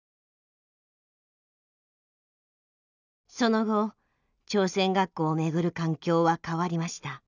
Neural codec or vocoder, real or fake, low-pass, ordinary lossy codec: none; real; 7.2 kHz; none